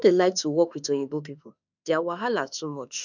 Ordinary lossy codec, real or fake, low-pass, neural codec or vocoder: none; fake; 7.2 kHz; autoencoder, 48 kHz, 32 numbers a frame, DAC-VAE, trained on Japanese speech